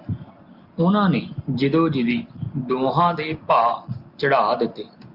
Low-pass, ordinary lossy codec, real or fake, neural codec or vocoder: 5.4 kHz; Opus, 24 kbps; real; none